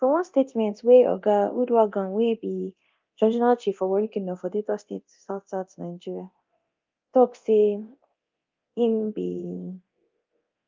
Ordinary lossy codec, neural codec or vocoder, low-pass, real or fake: Opus, 24 kbps; codec, 24 kHz, 0.9 kbps, DualCodec; 7.2 kHz; fake